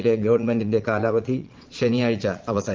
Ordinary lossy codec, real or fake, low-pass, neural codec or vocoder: Opus, 32 kbps; fake; 7.2 kHz; vocoder, 22.05 kHz, 80 mel bands, Vocos